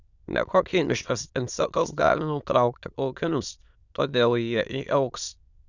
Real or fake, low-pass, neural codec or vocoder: fake; 7.2 kHz; autoencoder, 22.05 kHz, a latent of 192 numbers a frame, VITS, trained on many speakers